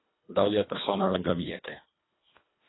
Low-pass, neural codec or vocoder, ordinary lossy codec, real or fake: 7.2 kHz; codec, 24 kHz, 1.5 kbps, HILCodec; AAC, 16 kbps; fake